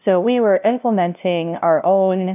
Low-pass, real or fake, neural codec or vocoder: 3.6 kHz; fake; codec, 16 kHz, 0.5 kbps, FunCodec, trained on LibriTTS, 25 frames a second